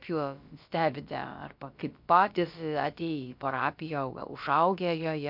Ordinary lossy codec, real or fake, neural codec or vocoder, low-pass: MP3, 48 kbps; fake; codec, 16 kHz, about 1 kbps, DyCAST, with the encoder's durations; 5.4 kHz